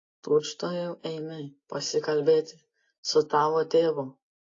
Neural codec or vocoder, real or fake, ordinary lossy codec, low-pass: none; real; AAC, 32 kbps; 7.2 kHz